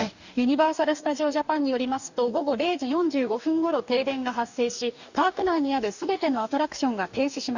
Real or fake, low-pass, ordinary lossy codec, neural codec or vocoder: fake; 7.2 kHz; none; codec, 44.1 kHz, 2.6 kbps, DAC